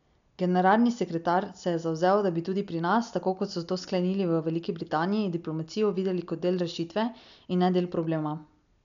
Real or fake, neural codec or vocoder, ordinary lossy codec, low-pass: real; none; none; 7.2 kHz